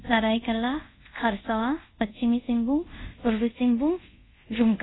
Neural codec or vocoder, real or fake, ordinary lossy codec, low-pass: codec, 24 kHz, 0.5 kbps, DualCodec; fake; AAC, 16 kbps; 7.2 kHz